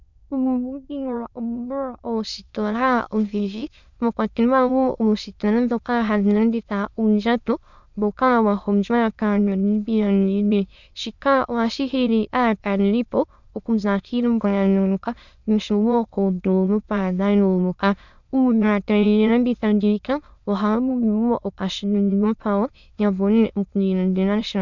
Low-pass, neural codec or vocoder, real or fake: 7.2 kHz; autoencoder, 22.05 kHz, a latent of 192 numbers a frame, VITS, trained on many speakers; fake